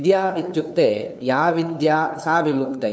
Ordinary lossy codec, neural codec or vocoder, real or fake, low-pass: none; codec, 16 kHz, 2 kbps, FunCodec, trained on LibriTTS, 25 frames a second; fake; none